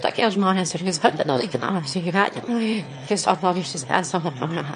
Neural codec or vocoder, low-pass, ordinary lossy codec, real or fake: autoencoder, 22.05 kHz, a latent of 192 numbers a frame, VITS, trained on one speaker; 9.9 kHz; MP3, 48 kbps; fake